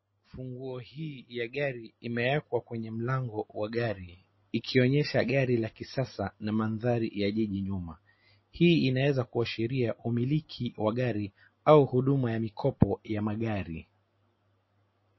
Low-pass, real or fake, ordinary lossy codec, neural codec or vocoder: 7.2 kHz; real; MP3, 24 kbps; none